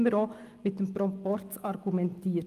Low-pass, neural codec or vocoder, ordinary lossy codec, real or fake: 14.4 kHz; none; Opus, 32 kbps; real